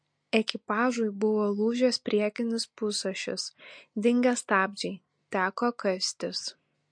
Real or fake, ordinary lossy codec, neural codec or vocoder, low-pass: real; MP3, 48 kbps; none; 9.9 kHz